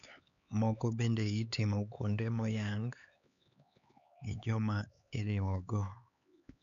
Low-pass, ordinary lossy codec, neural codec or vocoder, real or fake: 7.2 kHz; none; codec, 16 kHz, 4 kbps, X-Codec, HuBERT features, trained on LibriSpeech; fake